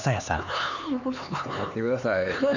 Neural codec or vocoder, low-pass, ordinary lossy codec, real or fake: codec, 16 kHz, 4 kbps, X-Codec, HuBERT features, trained on LibriSpeech; 7.2 kHz; none; fake